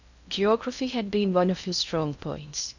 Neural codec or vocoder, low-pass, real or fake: codec, 16 kHz in and 24 kHz out, 0.6 kbps, FocalCodec, streaming, 2048 codes; 7.2 kHz; fake